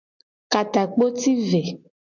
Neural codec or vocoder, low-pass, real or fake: none; 7.2 kHz; real